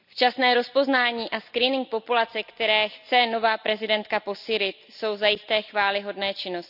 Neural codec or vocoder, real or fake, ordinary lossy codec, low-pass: none; real; none; 5.4 kHz